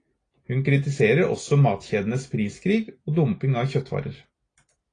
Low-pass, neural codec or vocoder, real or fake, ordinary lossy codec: 9.9 kHz; none; real; AAC, 32 kbps